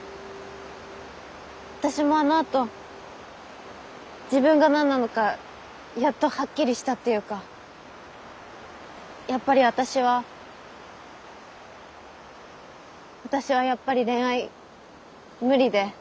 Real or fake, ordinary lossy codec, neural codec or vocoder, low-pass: real; none; none; none